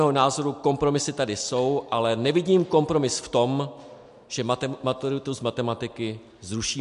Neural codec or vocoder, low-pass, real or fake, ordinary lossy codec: none; 10.8 kHz; real; MP3, 64 kbps